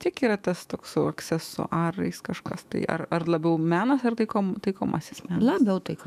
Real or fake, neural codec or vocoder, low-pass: fake; autoencoder, 48 kHz, 128 numbers a frame, DAC-VAE, trained on Japanese speech; 14.4 kHz